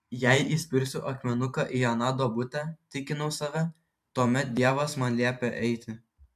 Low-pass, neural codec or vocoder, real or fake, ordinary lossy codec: 14.4 kHz; vocoder, 44.1 kHz, 128 mel bands every 512 samples, BigVGAN v2; fake; MP3, 96 kbps